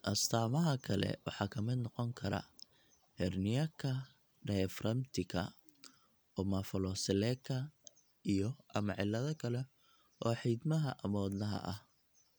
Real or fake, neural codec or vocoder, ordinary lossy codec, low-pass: real; none; none; none